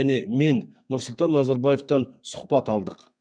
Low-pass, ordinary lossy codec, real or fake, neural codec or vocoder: 9.9 kHz; none; fake; codec, 32 kHz, 1.9 kbps, SNAC